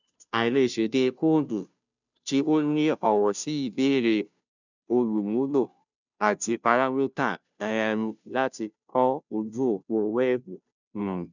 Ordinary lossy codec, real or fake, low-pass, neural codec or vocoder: none; fake; 7.2 kHz; codec, 16 kHz, 0.5 kbps, FunCodec, trained on Chinese and English, 25 frames a second